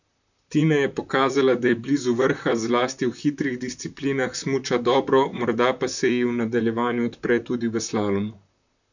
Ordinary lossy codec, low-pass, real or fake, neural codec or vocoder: none; 7.2 kHz; fake; vocoder, 44.1 kHz, 128 mel bands, Pupu-Vocoder